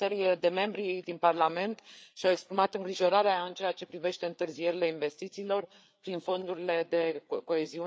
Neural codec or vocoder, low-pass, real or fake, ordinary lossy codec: codec, 16 kHz, 4 kbps, FreqCodec, larger model; none; fake; none